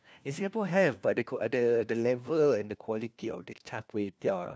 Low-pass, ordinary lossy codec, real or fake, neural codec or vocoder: none; none; fake; codec, 16 kHz, 1 kbps, FunCodec, trained on LibriTTS, 50 frames a second